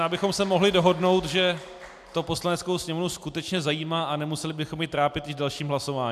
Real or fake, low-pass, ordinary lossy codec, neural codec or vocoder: real; 14.4 kHz; AAC, 96 kbps; none